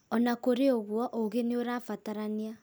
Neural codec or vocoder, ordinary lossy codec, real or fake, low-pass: none; none; real; none